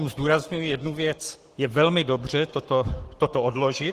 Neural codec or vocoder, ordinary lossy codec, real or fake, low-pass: codec, 44.1 kHz, 7.8 kbps, Pupu-Codec; Opus, 16 kbps; fake; 14.4 kHz